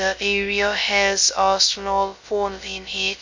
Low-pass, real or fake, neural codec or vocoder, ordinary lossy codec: 7.2 kHz; fake; codec, 16 kHz, 0.2 kbps, FocalCodec; MP3, 64 kbps